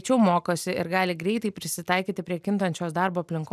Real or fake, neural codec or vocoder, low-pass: real; none; 14.4 kHz